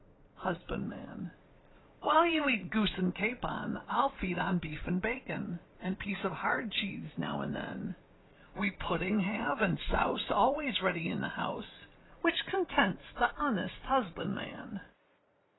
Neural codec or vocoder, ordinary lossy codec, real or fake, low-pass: vocoder, 44.1 kHz, 80 mel bands, Vocos; AAC, 16 kbps; fake; 7.2 kHz